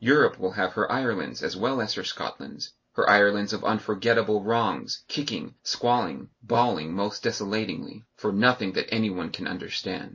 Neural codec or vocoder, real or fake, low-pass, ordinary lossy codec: none; real; 7.2 kHz; MP3, 32 kbps